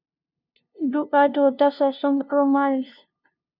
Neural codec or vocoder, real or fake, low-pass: codec, 16 kHz, 0.5 kbps, FunCodec, trained on LibriTTS, 25 frames a second; fake; 5.4 kHz